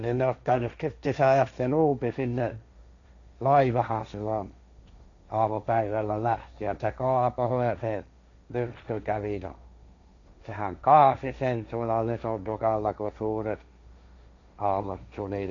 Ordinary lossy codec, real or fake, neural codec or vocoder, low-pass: none; fake; codec, 16 kHz, 1.1 kbps, Voila-Tokenizer; 7.2 kHz